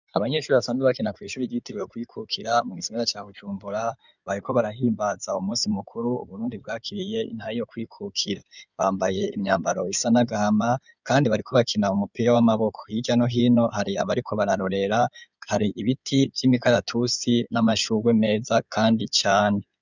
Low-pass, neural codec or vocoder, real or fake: 7.2 kHz; codec, 16 kHz in and 24 kHz out, 2.2 kbps, FireRedTTS-2 codec; fake